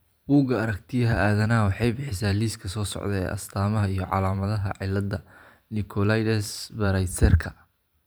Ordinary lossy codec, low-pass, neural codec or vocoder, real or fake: none; none; vocoder, 44.1 kHz, 128 mel bands every 512 samples, BigVGAN v2; fake